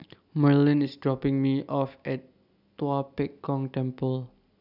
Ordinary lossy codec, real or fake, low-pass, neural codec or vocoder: none; real; 5.4 kHz; none